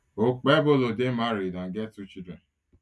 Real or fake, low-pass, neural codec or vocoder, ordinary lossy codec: real; none; none; none